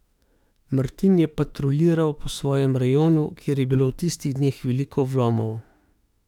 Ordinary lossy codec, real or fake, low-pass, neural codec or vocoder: none; fake; 19.8 kHz; autoencoder, 48 kHz, 32 numbers a frame, DAC-VAE, trained on Japanese speech